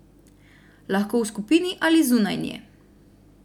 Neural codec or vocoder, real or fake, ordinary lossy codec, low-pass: none; real; none; 19.8 kHz